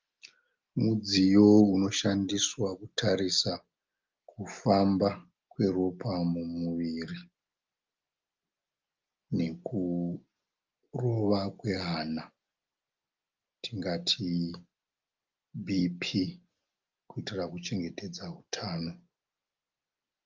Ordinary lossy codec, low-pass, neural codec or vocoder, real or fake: Opus, 32 kbps; 7.2 kHz; none; real